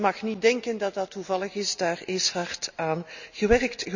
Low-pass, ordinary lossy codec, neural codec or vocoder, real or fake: 7.2 kHz; none; none; real